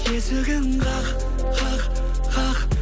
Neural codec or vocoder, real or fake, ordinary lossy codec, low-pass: none; real; none; none